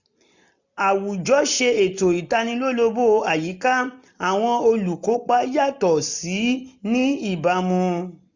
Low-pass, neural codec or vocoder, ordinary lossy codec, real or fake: 7.2 kHz; none; none; real